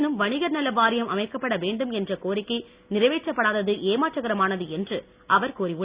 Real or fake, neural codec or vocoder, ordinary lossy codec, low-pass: real; none; Opus, 64 kbps; 3.6 kHz